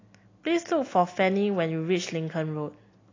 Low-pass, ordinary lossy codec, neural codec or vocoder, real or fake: 7.2 kHz; AAC, 32 kbps; none; real